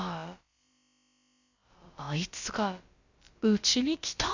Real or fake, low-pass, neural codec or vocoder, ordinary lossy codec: fake; 7.2 kHz; codec, 16 kHz, about 1 kbps, DyCAST, with the encoder's durations; Opus, 64 kbps